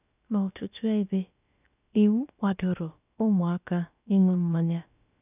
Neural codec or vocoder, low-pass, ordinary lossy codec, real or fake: codec, 16 kHz, about 1 kbps, DyCAST, with the encoder's durations; 3.6 kHz; none; fake